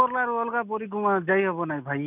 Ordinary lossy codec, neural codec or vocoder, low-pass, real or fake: none; none; 3.6 kHz; real